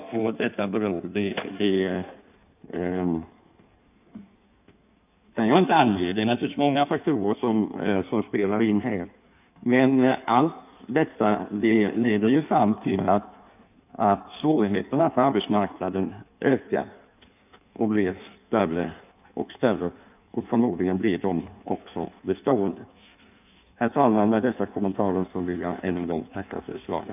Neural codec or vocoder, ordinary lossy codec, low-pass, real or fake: codec, 16 kHz in and 24 kHz out, 1.1 kbps, FireRedTTS-2 codec; none; 3.6 kHz; fake